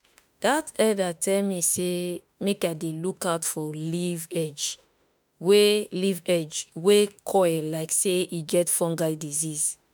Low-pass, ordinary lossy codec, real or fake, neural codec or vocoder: none; none; fake; autoencoder, 48 kHz, 32 numbers a frame, DAC-VAE, trained on Japanese speech